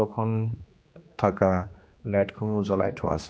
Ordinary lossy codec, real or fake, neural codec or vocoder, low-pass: none; fake; codec, 16 kHz, 2 kbps, X-Codec, HuBERT features, trained on general audio; none